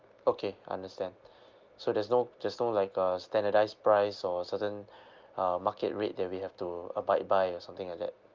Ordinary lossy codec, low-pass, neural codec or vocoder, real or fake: Opus, 32 kbps; 7.2 kHz; none; real